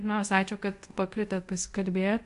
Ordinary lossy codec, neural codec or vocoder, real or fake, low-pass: MP3, 64 kbps; codec, 24 kHz, 0.5 kbps, DualCodec; fake; 10.8 kHz